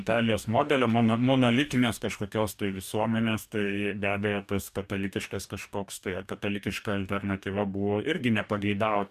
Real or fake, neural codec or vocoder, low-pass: fake; codec, 44.1 kHz, 2.6 kbps, DAC; 14.4 kHz